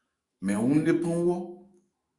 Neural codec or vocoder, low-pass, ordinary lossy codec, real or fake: autoencoder, 48 kHz, 128 numbers a frame, DAC-VAE, trained on Japanese speech; 10.8 kHz; Opus, 64 kbps; fake